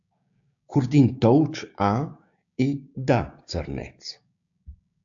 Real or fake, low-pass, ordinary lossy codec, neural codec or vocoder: fake; 7.2 kHz; AAC, 64 kbps; codec, 16 kHz, 6 kbps, DAC